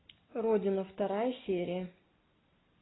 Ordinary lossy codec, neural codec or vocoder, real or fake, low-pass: AAC, 16 kbps; none; real; 7.2 kHz